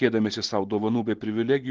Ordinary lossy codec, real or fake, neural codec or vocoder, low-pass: Opus, 16 kbps; real; none; 7.2 kHz